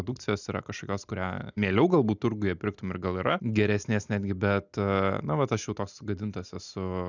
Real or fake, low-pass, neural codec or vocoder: real; 7.2 kHz; none